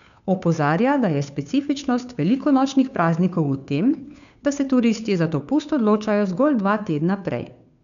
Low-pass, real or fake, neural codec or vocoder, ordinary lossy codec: 7.2 kHz; fake; codec, 16 kHz, 2 kbps, FunCodec, trained on Chinese and English, 25 frames a second; none